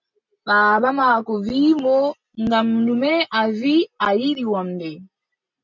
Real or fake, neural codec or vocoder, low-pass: real; none; 7.2 kHz